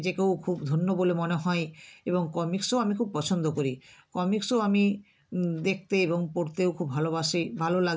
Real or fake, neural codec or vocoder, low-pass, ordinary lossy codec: real; none; none; none